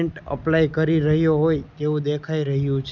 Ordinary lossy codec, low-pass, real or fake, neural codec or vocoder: Opus, 64 kbps; 7.2 kHz; real; none